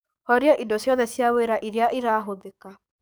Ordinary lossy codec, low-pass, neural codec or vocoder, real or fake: none; none; codec, 44.1 kHz, 7.8 kbps, DAC; fake